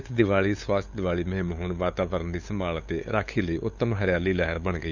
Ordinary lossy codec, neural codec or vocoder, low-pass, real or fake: none; codec, 16 kHz, 8 kbps, FunCodec, trained on LibriTTS, 25 frames a second; 7.2 kHz; fake